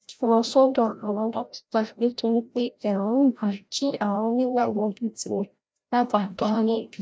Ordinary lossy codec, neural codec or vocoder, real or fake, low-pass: none; codec, 16 kHz, 0.5 kbps, FreqCodec, larger model; fake; none